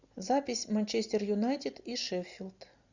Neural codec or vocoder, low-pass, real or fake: none; 7.2 kHz; real